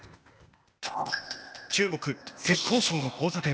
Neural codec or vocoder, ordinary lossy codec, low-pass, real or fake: codec, 16 kHz, 0.8 kbps, ZipCodec; none; none; fake